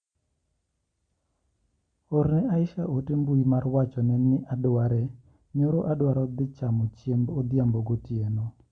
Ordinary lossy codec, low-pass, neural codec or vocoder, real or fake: Opus, 64 kbps; 9.9 kHz; none; real